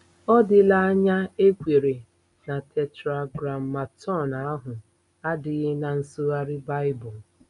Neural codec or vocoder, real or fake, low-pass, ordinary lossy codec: none; real; 10.8 kHz; none